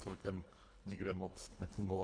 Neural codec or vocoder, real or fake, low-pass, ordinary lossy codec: codec, 24 kHz, 1.5 kbps, HILCodec; fake; 9.9 kHz; MP3, 64 kbps